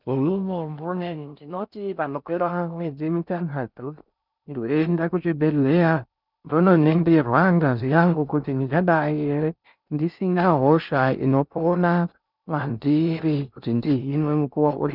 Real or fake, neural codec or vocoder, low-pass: fake; codec, 16 kHz in and 24 kHz out, 0.6 kbps, FocalCodec, streaming, 4096 codes; 5.4 kHz